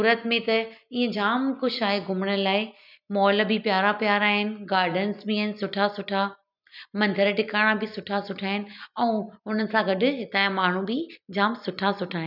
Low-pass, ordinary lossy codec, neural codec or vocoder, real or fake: 5.4 kHz; none; none; real